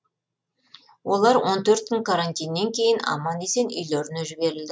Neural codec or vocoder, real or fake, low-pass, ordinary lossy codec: none; real; none; none